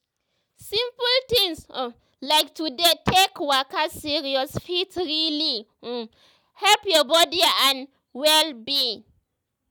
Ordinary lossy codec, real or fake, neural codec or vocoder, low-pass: none; real; none; none